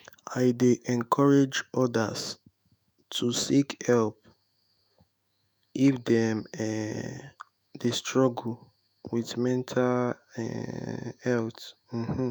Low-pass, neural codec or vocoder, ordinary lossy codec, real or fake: none; autoencoder, 48 kHz, 128 numbers a frame, DAC-VAE, trained on Japanese speech; none; fake